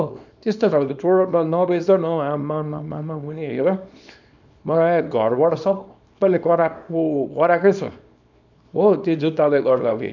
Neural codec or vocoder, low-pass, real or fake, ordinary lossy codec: codec, 24 kHz, 0.9 kbps, WavTokenizer, small release; 7.2 kHz; fake; none